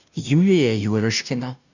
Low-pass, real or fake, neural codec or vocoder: 7.2 kHz; fake; codec, 16 kHz, 0.5 kbps, FunCodec, trained on Chinese and English, 25 frames a second